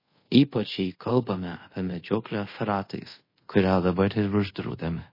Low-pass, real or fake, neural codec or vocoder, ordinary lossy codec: 5.4 kHz; fake; codec, 24 kHz, 0.5 kbps, DualCodec; MP3, 24 kbps